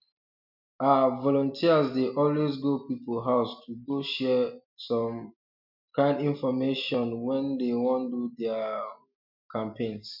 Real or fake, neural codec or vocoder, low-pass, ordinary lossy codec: real; none; 5.4 kHz; MP3, 48 kbps